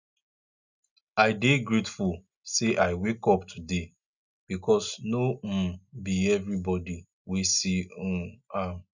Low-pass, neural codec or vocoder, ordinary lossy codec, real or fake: 7.2 kHz; none; none; real